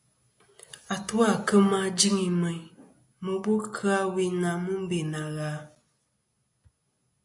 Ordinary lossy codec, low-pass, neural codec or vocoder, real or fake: AAC, 64 kbps; 10.8 kHz; none; real